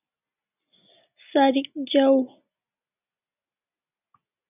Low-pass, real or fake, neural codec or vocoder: 3.6 kHz; real; none